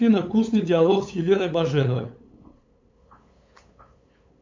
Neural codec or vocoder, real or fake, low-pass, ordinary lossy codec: codec, 16 kHz, 8 kbps, FunCodec, trained on LibriTTS, 25 frames a second; fake; 7.2 kHz; MP3, 64 kbps